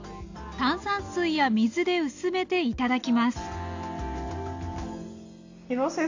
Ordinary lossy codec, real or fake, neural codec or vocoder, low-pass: AAC, 48 kbps; real; none; 7.2 kHz